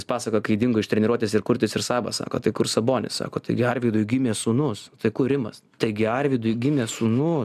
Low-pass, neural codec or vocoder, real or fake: 14.4 kHz; none; real